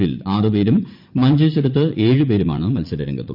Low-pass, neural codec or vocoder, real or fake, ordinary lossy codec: 5.4 kHz; vocoder, 44.1 kHz, 80 mel bands, Vocos; fake; none